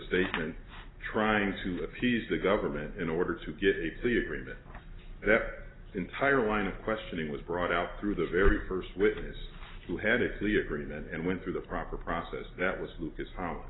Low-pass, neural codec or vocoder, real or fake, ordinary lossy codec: 7.2 kHz; none; real; AAC, 16 kbps